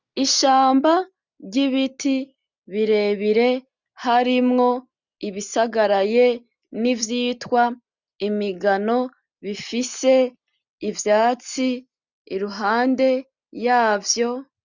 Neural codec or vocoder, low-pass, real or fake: none; 7.2 kHz; real